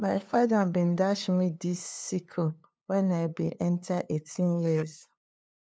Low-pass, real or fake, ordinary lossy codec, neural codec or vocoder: none; fake; none; codec, 16 kHz, 2 kbps, FunCodec, trained on LibriTTS, 25 frames a second